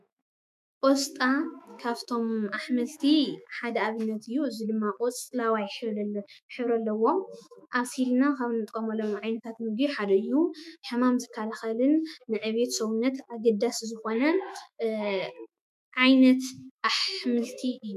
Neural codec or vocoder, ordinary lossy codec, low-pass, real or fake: autoencoder, 48 kHz, 128 numbers a frame, DAC-VAE, trained on Japanese speech; MP3, 96 kbps; 14.4 kHz; fake